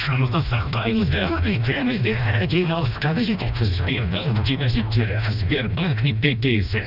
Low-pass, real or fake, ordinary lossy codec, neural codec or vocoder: 5.4 kHz; fake; none; codec, 16 kHz, 1 kbps, FreqCodec, smaller model